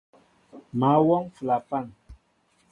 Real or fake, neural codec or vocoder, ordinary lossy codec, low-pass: real; none; MP3, 48 kbps; 10.8 kHz